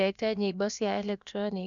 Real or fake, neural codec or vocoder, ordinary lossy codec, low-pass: fake; codec, 16 kHz, about 1 kbps, DyCAST, with the encoder's durations; none; 7.2 kHz